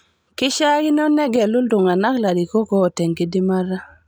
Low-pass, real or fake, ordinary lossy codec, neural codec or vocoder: none; real; none; none